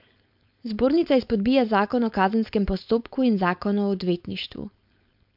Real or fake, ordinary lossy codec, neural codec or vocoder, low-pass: fake; MP3, 48 kbps; codec, 16 kHz, 4.8 kbps, FACodec; 5.4 kHz